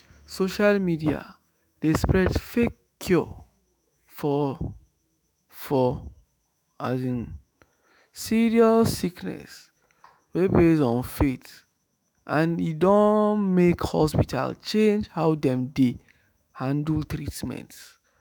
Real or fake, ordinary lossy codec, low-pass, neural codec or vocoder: fake; none; none; autoencoder, 48 kHz, 128 numbers a frame, DAC-VAE, trained on Japanese speech